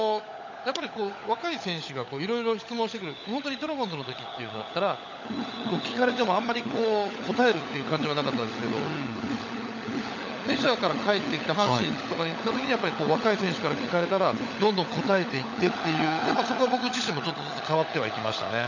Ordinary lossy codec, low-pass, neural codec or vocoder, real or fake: none; 7.2 kHz; codec, 16 kHz, 16 kbps, FunCodec, trained on LibriTTS, 50 frames a second; fake